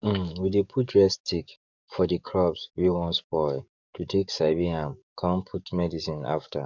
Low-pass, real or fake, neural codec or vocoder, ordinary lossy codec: 7.2 kHz; fake; codec, 44.1 kHz, 7.8 kbps, DAC; none